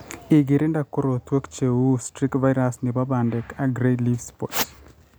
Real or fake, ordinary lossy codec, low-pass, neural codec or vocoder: real; none; none; none